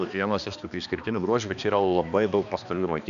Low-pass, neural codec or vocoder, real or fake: 7.2 kHz; codec, 16 kHz, 2 kbps, X-Codec, HuBERT features, trained on balanced general audio; fake